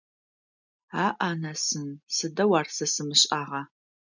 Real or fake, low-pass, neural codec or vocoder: real; 7.2 kHz; none